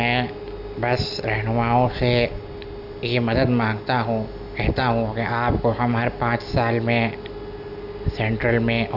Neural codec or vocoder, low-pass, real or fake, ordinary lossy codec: none; 5.4 kHz; real; AAC, 48 kbps